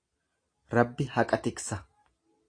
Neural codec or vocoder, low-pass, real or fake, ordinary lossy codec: none; 9.9 kHz; real; AAC, 48 kbps